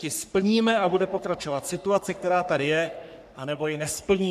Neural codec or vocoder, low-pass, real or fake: codec, 44.1 kHz, 3.4 kbps, Pupu-Codec; 14.4 kHz; fake